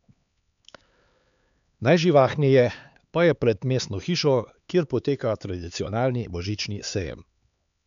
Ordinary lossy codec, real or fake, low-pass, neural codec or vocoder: none; fake; 7.2 kHz; codec, 16 kHz, 4 kbps, X-Codec, HuBERT features, trained on LibriSpeech